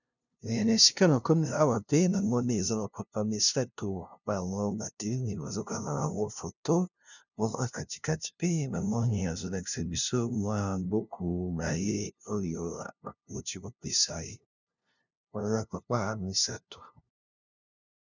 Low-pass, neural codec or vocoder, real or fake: 7.2 kHz; codec, 16 kHz, 0.5 kbps, FunCodec, trained on LibriTTS, 25 frames a second; fake